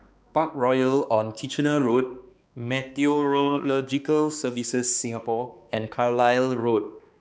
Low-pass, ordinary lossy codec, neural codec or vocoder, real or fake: none; none; codec, 16 kHz, 2 kbps, X-Codec, HuBERT features, trained on balanced general audio; fake